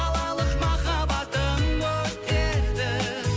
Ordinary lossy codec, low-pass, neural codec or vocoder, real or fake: none; none; none; real